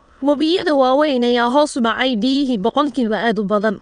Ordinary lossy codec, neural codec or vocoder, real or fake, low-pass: none; autoencoder, 22.05 kHz, a latent of 192 numbers a frame, VITS, trained on many speakers; fake; 9.9 kHz